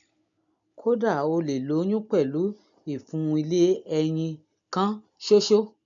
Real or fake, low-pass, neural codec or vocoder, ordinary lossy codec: real; 7.2 kHz; none; none